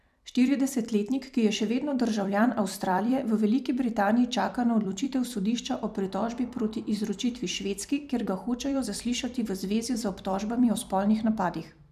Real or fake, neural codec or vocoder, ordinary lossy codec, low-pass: fake; vocoder, 44.1 kHz, 128 mel bands every 256 samples, BigVGAN v2; none; 14.4 kHz